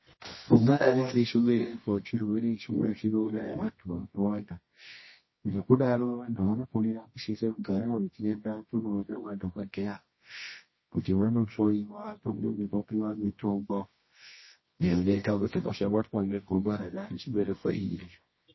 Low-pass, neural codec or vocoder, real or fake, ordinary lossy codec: 7.2 kHz; codec, 24 kHz, 0.9 kbps, WavTokenizer, medium music audio release; fake; MP3, 24 kbps